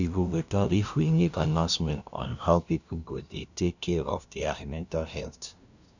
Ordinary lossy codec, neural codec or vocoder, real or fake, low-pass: none; codec, 16 kHz, 0.5 kbps, FunCodec, trained on LibriTTS, 25 frames a second; fake; 7.2 kHz